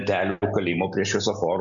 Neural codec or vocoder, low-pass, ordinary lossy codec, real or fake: none; 7.2 kHz; MP3, 96 kbps; real